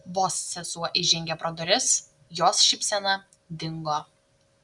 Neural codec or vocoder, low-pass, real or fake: none; 10.8 kHz; real